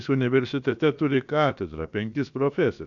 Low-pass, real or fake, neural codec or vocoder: 7.2 kHz; fake; codec, 16 kHz, about 1 kbps, DyCAST, with the encoder's durations